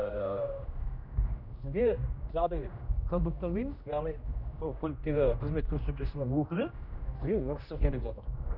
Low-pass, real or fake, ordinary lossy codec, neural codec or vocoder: 5.4 kHz; fake; none; codec, 16 kHz, 1 kbps, X-Codec, HuBERT features, trained on general audio